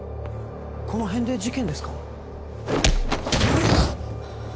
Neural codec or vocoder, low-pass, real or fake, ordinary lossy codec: none; none; real; none